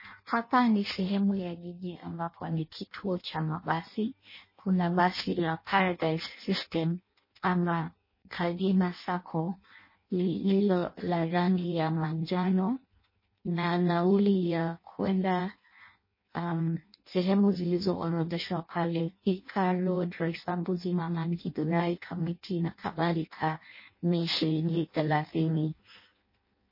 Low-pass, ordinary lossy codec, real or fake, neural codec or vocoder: 5.4 kHz; MP3, 24 kbps; fake; codec, 16 kHz in and 24 kHz out, 0.6 kbps, FireRedTTS-2 codec